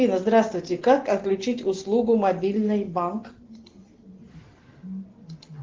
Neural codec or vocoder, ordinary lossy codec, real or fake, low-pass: none; Opus, 16 kbps; real; 7.2 kHz